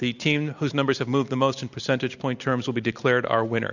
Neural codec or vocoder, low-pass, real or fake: none; 7.2 kHz; real